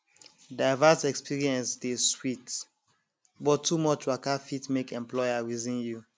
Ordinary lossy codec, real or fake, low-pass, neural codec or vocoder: none; real; none; none